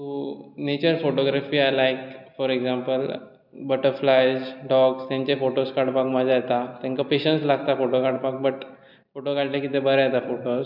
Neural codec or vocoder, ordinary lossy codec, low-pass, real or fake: none; none; 5.4 kHz; real